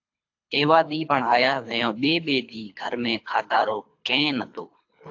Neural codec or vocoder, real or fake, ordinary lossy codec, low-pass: codec, 24 kHz, 3 kbps, HILCodec; fake; AAC, 48 kbps; 7.2 kHz